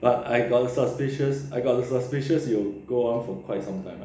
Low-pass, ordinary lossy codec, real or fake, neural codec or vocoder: none; none; real; none